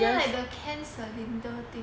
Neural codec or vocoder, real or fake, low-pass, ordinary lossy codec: none; real; none; none